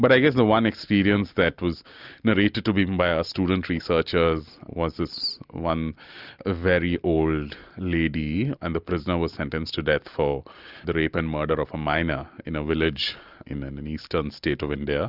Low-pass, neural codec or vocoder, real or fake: 5.4 kHz; none; real